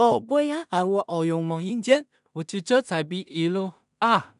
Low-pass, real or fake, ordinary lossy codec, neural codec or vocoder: 10.8 kHz; fake; none; codec, 16 kHz in and 24 kHz out, 0.4 kbps, LongCat-Audio-Codec, two codebook decoder